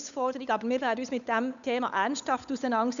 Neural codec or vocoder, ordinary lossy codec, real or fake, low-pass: codec, 16 kHz, 8 kbps, FunCodec, trained on Chinese and English, 25 frames a second; none; fake; 7.2 kHz